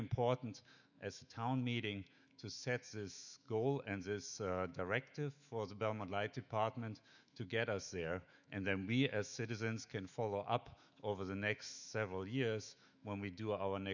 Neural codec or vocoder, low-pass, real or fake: autoencoder, 48 kHz, 128 numbers a frame, DAC-VAE, trained on Japanese speech; 7.2 kHz; fake